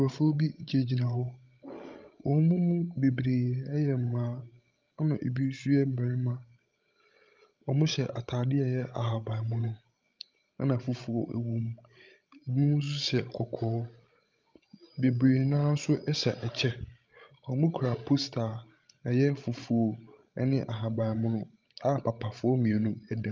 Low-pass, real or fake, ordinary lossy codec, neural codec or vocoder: 7.2 kHz; fake; Opus, 24 kbps; codec, 16 kHz, 16 kbps, FreqCodec, larger model